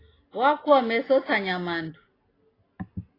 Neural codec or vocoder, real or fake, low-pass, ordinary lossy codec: none; real; 5.4 kHz; AAC, 24 kbps